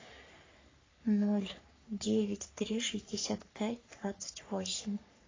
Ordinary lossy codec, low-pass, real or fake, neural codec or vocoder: AAC, 32 kbps; 7.2 kHz; fake; codec, 44.1 kHz, 3.4 kbps, Pupu-Codec